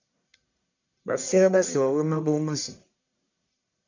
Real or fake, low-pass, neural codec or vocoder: fake; 7.2 kHz; codec, 44.1 kHz, 1.7 kbps, Pupu-Codec